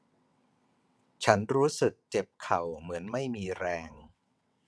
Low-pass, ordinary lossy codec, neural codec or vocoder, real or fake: none; none; vocoder, 22.05 kHz, 80 mel bands, WaveNeXt; fake